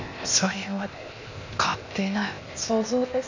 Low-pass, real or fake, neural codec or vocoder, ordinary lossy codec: 7.2 kHz; fake; codec, 16 kHz, 0.8 kbps, ZipCodec; none